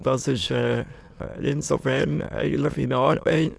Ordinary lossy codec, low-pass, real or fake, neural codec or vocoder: none; none; fake; autoencoder, 22.05 kHz, a latent of 192 numbers a frame, VITS, trained on many speakers